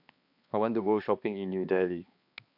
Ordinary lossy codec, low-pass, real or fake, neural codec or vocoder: AAC, 48 kbps; 5.4 kHz; fake; codec, 16 kHz, 2 kbps, X-Codec, HuBERT features, trained on balanced general audio